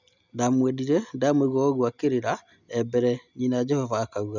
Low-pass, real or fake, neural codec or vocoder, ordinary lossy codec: 7.2 kHz; real; none; none